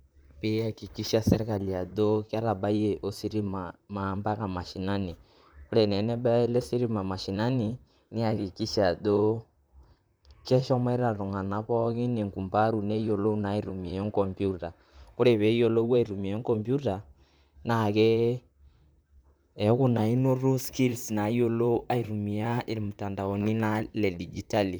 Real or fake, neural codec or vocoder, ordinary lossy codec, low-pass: fake; vocoder, 44.1 kHz, 128 mel bands, Pupu-Vocoder; none; none